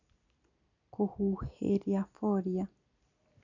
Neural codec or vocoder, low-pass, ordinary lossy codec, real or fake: none; 7.2 kHz; none; real